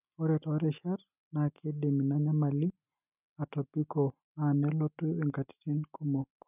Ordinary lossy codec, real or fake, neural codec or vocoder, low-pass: none; real; none; 3.6 kHz